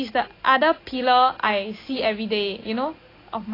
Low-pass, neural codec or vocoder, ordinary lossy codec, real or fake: 5.4 kHz; none; AAC, 24 kbps; real